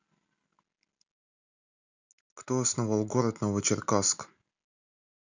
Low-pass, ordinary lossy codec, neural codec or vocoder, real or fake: 7.2 kHz; none; none; real